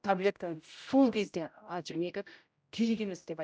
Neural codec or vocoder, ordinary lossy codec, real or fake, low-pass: codec, 16 kHz, 0.5 kbps, X-Codec, HuBERT features, trained on general audio; none; fake; none